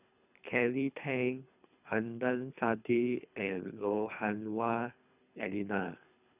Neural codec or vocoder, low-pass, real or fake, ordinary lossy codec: codec, 24 kHz, 3 kbps, HILCodec; 3.6 kHz; fake; none